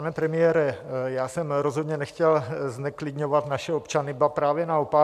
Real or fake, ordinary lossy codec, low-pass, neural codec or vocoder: real; MP3, 96 kbps; 14.4 kHz; none